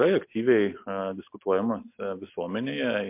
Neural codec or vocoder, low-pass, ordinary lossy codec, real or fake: none; 3.6 kHz; MP3, 32 kbps; real